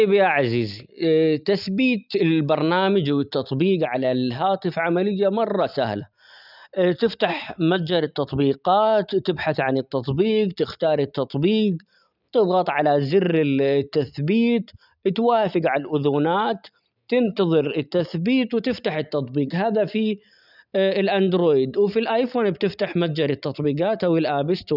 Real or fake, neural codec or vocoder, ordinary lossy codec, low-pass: real; none; none; 5.4 kHz